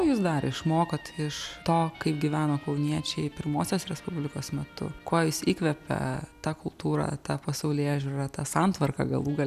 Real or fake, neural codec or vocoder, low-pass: real; none; 14.4 kHz